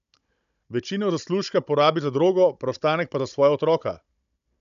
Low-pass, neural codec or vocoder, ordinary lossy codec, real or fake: 7.2 kHz; codec, 16 kHz, 16 kbps, FunCodec, trained on Chinese and English, 50 frames a second; none; fake